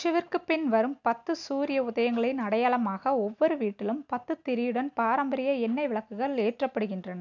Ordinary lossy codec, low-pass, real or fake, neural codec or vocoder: none; 7.2 kHz; real; none